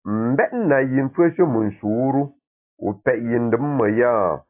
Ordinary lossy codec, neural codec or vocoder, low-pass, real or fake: AAC, 24 kbps; none; 3.6 kHz; real